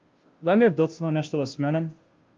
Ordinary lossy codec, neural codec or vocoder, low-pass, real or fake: Opus, 32 kbps; codec, 16 kHz, 0.5 kbps, FunCodec, trained on Chinese and English, 25 frames a second; 7.2 kHz; fake